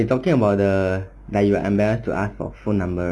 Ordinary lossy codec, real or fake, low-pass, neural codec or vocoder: none; real; none; none